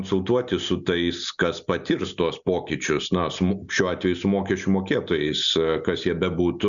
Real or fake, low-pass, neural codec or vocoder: real; 7.2 kHz; none